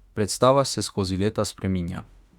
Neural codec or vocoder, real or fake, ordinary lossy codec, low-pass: autoencoder, 48 kHz, 32 numbers a frame, DAC-VAE, trained on Japanese speech; fake; Opus, 64 kbps; 19.8 kHz